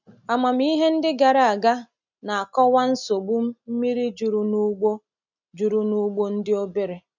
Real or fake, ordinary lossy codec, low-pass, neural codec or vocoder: real; none; 7.2 kHz; none